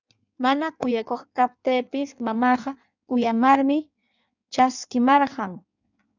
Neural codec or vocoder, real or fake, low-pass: codec, 16 kHz in and 24 kHz out, 1.1 kbps, FireRedTTS-2 codec; fake; 7.2 kHz